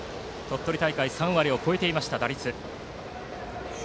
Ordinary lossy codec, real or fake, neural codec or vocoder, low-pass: none; real; none; none